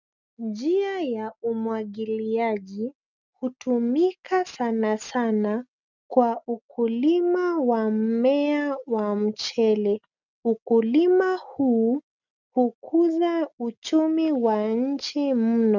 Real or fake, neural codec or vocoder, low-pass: real; none; 7.2 kHz